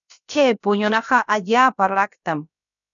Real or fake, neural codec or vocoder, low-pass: fake; codec, 16 kHz, about 1 kbps, DyCAST, with the encoder's durations; 7.2 kHz